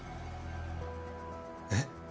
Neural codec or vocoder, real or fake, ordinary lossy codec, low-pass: none; real; none; none